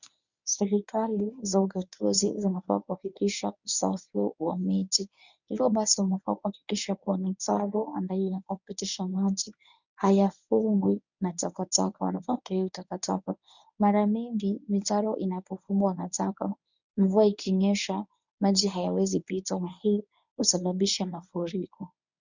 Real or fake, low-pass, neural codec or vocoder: fake; 7.2 kHz; codec, 24 kHz, 0.9 kbps, WavTokenizer, medium speech release version 1